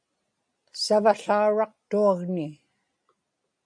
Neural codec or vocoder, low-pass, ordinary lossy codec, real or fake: none; 9.9 kHz; MP3, 96 kbps; real